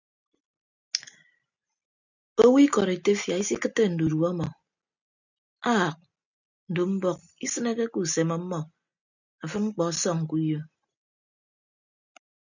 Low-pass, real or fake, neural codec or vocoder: 7.2 kHz; real; none